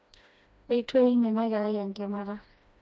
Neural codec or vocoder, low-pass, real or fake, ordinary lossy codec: codec, 16 kHz, 1 kbps, FreqCodec, smaller model; none; fake; none